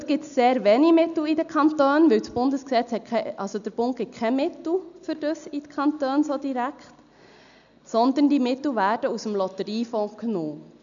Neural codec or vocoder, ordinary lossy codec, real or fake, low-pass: none; none; real; 7.2 kHz